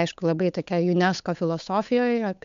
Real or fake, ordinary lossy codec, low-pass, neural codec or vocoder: fake; MP3, 64 kbps; 7.2 kHz; codec, 16 kHz, 8 kbps, FunCodec, trained on Chinese and English, 25 frames a second